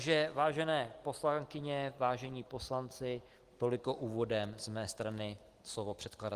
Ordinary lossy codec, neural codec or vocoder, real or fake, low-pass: Opus, 24 kbps; none; real; 14.4 kHz